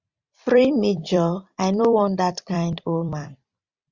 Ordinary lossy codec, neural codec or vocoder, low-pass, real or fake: Opus, 64 kbps; vocoder, 44.1 kHz, 128 mel bands every 256 samples, BigVGAN v2; 7.2 kHz; fake